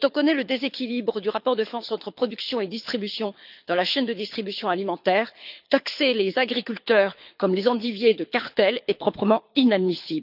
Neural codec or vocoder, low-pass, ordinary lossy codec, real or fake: codec, 24 kHz, 6 kbps, HILCodec; 5.4 kHz; none; fake